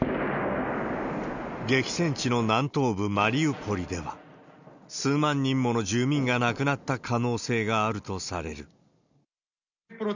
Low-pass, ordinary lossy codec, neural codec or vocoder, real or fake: 7.2 kHz; none; none; real